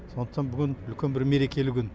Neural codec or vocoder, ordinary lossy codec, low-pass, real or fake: none; none; none; real